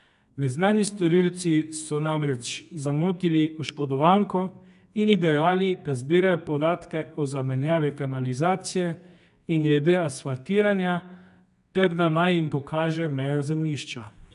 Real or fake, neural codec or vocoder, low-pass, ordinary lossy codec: fake; codec, 24 kHz, 0.9 kbps, WavTokenizer, medium music audio release; 10.8 kHz; none